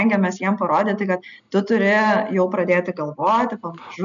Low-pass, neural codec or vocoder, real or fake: 7.2 kHz; none; real